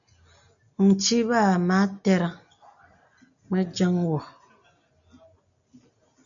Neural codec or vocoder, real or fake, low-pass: none; real; 7.2 kHz